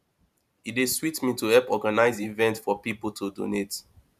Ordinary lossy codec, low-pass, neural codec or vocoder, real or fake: none; 14.4 kHz; vocoder, 44.1 kHz, 128 mel bands every 512 samples, BigVGAN v2; fake